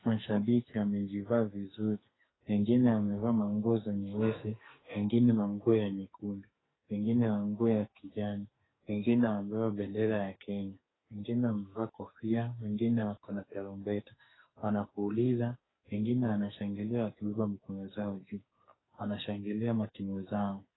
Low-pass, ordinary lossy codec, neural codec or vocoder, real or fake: 7.2 kHz; AAC, 16 kbps; codec, 44.1 kHz, 2.6 kbps, SNAC; fake